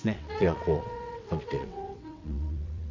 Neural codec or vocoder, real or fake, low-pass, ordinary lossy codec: vocoder, 22.05 kHz, 80 mel bands, WaveNeXt; fake; 7.2 kHz; none